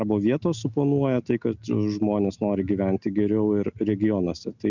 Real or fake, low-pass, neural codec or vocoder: real; 7.2 kHz; none